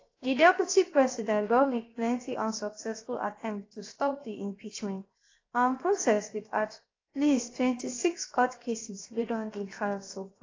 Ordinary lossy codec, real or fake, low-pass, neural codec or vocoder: AAC, 32 kbps; fake; 7.2 kHz; codec, 16 kHz, 0.7 kbps, FocalCodec